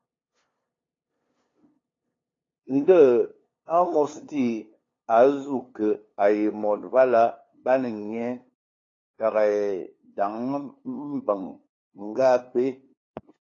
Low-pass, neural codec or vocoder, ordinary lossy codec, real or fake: 7.2 kHz; codec, 16 kHz, 2 kbps, FunCodec, trained on LibriTTS, 25 frames a second; AAC, 32 kbps; fake